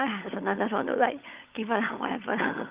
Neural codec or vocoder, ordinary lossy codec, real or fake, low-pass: codec, 16 kHz, 16 kbps, FunCodec, trained on LibriTTS, 50 frames a second; Opus, 24 kbps; fake; 3.6 kHz